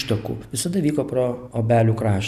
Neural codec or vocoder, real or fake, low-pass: none; real; 14.4 kHz